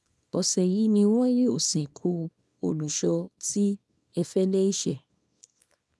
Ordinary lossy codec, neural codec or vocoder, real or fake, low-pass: none; codec, 24 kHz, 0.9 kbps, WavTokenizer, small release; fake; none